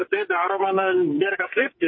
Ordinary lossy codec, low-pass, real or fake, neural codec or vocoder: MP3, 24 kbps; 7.2 kHz; fake; codec, 44.1 kHz, 2.6 kbps, SNAC